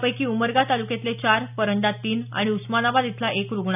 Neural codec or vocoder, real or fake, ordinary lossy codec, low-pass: none; real; none; 3.6 kHz